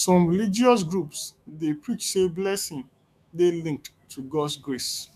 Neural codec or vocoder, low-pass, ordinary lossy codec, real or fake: autoencoder, 48 kHz, 128 numbers a frame, DAC-VAE, trained on Japanese speech; 14.4 kHz; none; fake